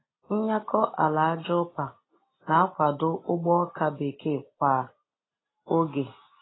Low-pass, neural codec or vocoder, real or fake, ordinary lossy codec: 7.2 kHz; none; real; AAC, 16 kbps